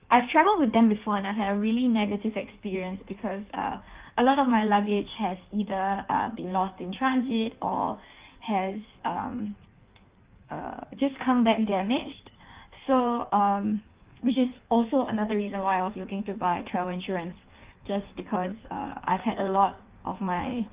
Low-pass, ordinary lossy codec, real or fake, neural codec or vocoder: 3.6 kHz; Opus, 32 kbps; fake; codec, 16 kHz in and 24 kHz out, 1.1 kbps, FireRedTTS-2 codec